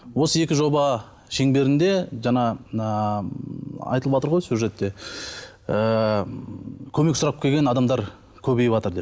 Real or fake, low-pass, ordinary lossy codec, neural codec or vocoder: real; none; none; none